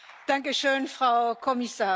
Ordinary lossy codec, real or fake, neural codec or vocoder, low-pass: none; real; none; none